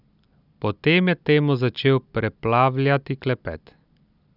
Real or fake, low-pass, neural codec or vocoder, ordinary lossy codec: real; 5.4 kHz; none; none